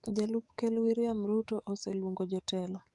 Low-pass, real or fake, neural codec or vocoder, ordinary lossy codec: 10.8 kHz; fake; codec, 44.1 kHz, 7.8 kbps, DAC; none